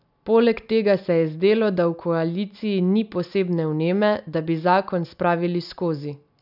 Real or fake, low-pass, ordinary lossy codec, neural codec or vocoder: real; 5.4 kHz; none; none